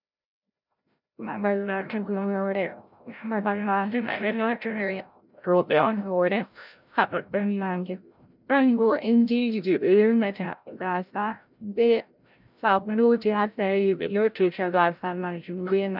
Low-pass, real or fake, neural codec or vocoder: 5.4 kHz; fake; codec, 16 kHz, 0.5 kbps, FreqCodec, larger model